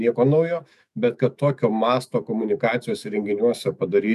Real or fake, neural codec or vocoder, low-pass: fake; autoencoder, 48 kHz, 128 numbers a frame, DAC-VAE, trained on Japanese speech; 14.4 kHz